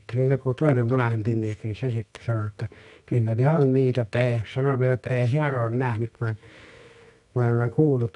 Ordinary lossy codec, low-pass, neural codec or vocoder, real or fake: none; 10.8 kHz; codec, 24 kHz, 0.9 kbps, WavTokenizer, medium music audio release; fake